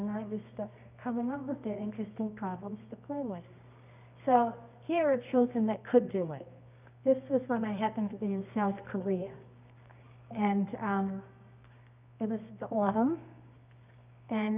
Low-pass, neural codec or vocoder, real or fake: 3.6 kHz; codec, 24 kHz, 0.9 kbps, WavTokenizer, medium music audio release; fake